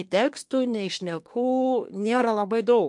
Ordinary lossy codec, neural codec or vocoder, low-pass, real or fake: MP3, 64 kbps; codec, 24 kHz, 1 kbps, SNAC; 10.8 kHz; fake